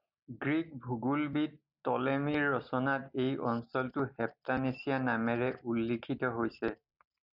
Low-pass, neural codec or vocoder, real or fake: 5.4 kHz; none; real